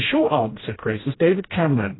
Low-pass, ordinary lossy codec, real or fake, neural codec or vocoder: 7.2 kHz; AAC, 16 kbps; fake; codec, 16 kHz, 1 kbps, FreqCodec, smaller model